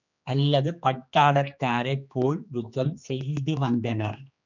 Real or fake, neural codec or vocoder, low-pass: fake; codec, 16 kHz, 2 kbps, X-Codec, HuBERT features, trained on general audio; 7.2 kHz